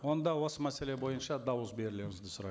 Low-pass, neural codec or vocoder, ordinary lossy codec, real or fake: none; none; none; real